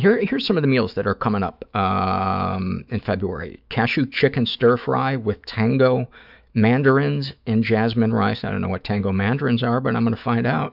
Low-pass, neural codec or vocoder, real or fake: 5.4 kHz; autoencoder, 48 kHz, 128 numbers a frame, DAC-VAE, trained on Japanese speech; fake